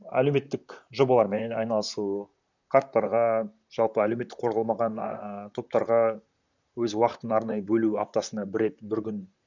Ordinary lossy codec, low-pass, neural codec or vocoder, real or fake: none; 7.2 kHz; vocoder, 44.1 kHz, 128 mel bands, Pupu-Vocoder; fake